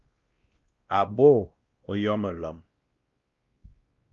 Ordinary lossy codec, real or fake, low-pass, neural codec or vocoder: Opus, 32 kbps; fake; 7.2 kHz; codec, 16 kHz, 1 kbps, X-Codec, WavLM features, trained on Multilingual LibriSpeech